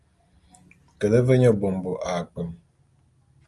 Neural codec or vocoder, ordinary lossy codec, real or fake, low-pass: none; Opus, 32 kbps; real; 10.8 kHz